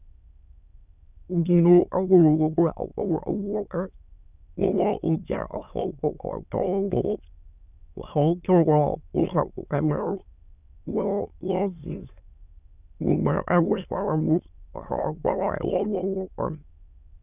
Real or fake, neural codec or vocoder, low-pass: fake; autoencoder, 22.05 kHz, a latent of 192 numbers a frame, VITS, trained on many speakers; 3.6 kHz